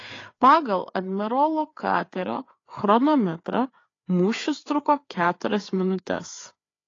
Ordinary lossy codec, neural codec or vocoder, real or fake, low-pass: AAC, 32 kbps; codec, 16 kHz, 4 kbps, FreqCodec, larger model; fake; 7.2 kHz